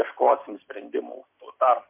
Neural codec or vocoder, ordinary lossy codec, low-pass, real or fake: none; MP3, 32 kbps; 3.6 kHz; real